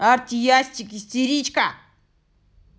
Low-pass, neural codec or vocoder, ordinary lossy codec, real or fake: none; none; none; real